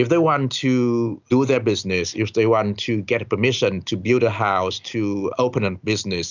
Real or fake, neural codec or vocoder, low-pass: real; none; 7.2 kHz